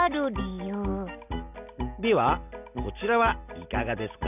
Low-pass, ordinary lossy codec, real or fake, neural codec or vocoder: 3.6 kHz; none; real; none